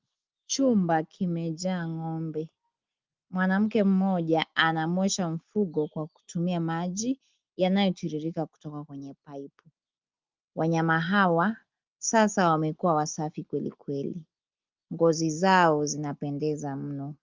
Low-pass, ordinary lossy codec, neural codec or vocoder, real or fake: 7.2 kHz; Opus, 32 kbps; none; real